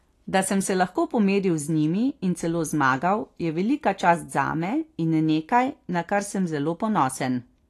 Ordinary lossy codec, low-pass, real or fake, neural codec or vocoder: AAC, 48 kbps; 14.4 kHz; real; none